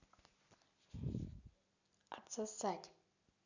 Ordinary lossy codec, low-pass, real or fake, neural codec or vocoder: none; 7.2 kHz; real; none